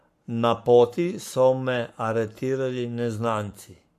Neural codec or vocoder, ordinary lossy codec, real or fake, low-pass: codec, 44.1 kHz, 7.8 kbps, Pupu-Codec; MP3, 64 kbps; fake; 14.4 kHz